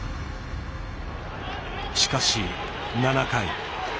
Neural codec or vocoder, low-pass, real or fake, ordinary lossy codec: none; none; real; none